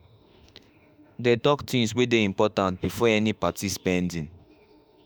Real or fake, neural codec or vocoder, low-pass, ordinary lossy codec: fake; autoencoder, 48 kHz, 32 numbers a frame, DAC-VAE, trained on Japanese speech; none; none